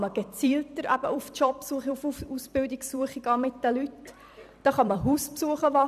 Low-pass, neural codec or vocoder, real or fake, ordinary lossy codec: 14.4 kHz; vocoder, 44.1 kHz, 128 mel bands every 256 samples, BigVGAN v2; fake; none